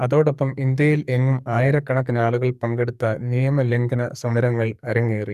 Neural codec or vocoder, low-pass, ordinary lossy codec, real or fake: codec, 44.1 kHz, 2.6 kbps, SNAC; 14.4 kHz; none; fake